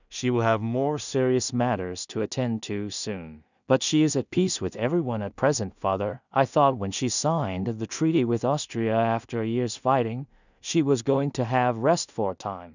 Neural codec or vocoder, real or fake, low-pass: codec, 16 kHz in and 24 kHz out, 0.4 kbps, LongCat-Audio-Codec, two codebook decoder; fake; 7.2 kHz